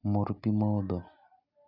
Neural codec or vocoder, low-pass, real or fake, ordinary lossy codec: none; 5.4 kHz; real; none